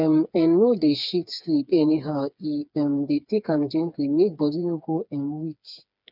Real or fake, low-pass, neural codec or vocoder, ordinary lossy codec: fake; 5.4 kHz; codec, 16 kHz, 4 kbps, FreqCodec, smaller model; none